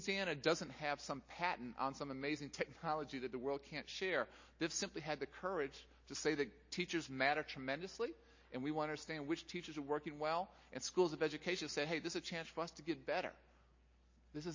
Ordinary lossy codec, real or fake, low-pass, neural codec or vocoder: MP3, 32 kbps; real; 7.2 kHz; none